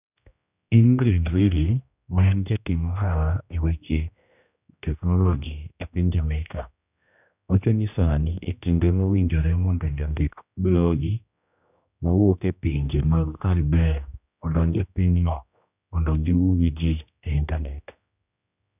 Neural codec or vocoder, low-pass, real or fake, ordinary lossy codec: codec, 16 kHz, 1 kbps, X-Codec, HuBERT features, trained on general audio; 3.6 kHz; fake; none